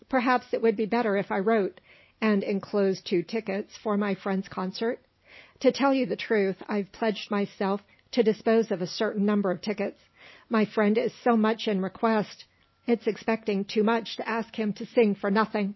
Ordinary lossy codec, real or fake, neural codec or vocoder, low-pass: MP3, 24 kbps; real; none; 7.2 kHz